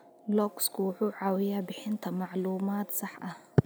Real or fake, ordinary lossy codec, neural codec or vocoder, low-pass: real; none; none; none